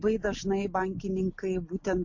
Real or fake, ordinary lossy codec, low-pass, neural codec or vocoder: real; MP3, 48 kbps; 7.2 kHz; none